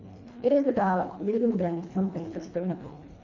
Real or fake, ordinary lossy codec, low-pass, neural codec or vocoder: fake; none; 7.2 kHz; codec, 24 kHz, 1.5 kbps, HILCodec